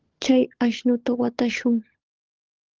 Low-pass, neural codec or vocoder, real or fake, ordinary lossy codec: 7.2 kHz; codec, 16 kHz, 16 kbps, FunCodec, trained on LibriTTS, 50 frames a second; fake; Opus, 16 kbps